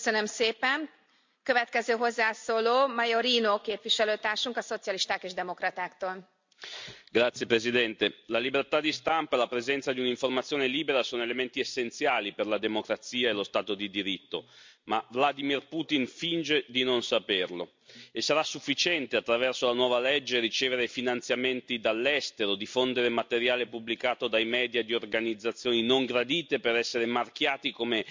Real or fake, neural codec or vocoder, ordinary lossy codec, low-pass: real; none; MP3, 64 kbps; 7.2 kHz